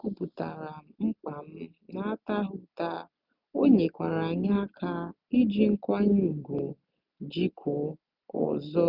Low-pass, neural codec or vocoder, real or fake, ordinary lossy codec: 5.4 kHz; none; real; none